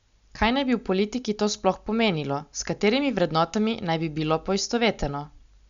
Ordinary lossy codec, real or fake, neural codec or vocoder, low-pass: Opus, 64 kbps; real; none; 7.2 kHz